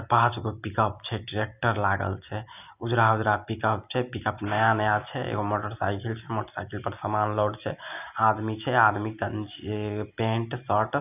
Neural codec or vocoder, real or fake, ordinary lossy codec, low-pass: none; real; none; 3.6 kHz